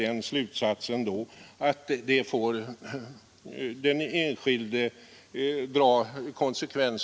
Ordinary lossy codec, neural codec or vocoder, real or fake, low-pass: none; none; real; none